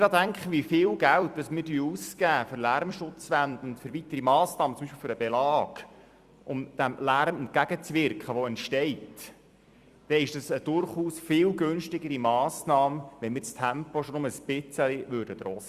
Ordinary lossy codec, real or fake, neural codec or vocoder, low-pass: Opus, 64 kbps; fake; vocoder, 44.1 kHz, 128 mel bands every 256 samples, BigVGAN v2; 14.4 kHz